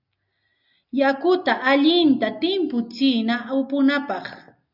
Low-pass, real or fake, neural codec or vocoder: 5.4 kHz; real; none